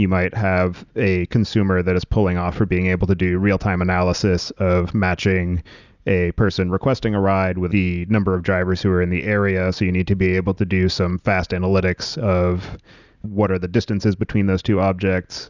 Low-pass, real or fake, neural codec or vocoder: 7.2 kHz; real; none